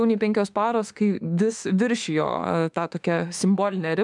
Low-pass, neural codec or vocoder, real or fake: 10.8 kHz; autoencoder, 48 kHz, 32 numbers a frame, DAC-VAE, trained on Japanese speech; fake